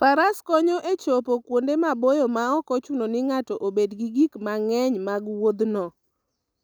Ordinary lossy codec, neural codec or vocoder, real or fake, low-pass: none; none; real; none